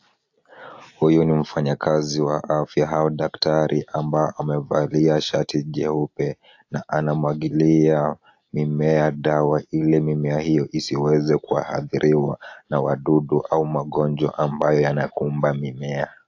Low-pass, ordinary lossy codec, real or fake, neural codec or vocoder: 7.2 kHz; AAC, 48 kbps; real; none